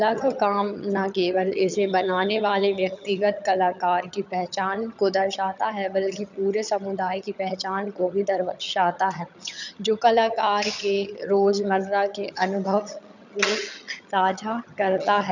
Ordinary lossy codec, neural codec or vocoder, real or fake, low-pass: none; vocoder, 22.05 kHz, 80 mel bands, HiFi-GAN; fake; 7.2 kHz